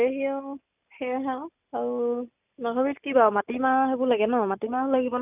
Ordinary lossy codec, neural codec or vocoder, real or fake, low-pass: none; none; real; 3.6 kHz